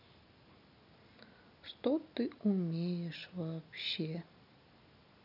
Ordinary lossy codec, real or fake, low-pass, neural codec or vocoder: none; real; 5.4 kHz; none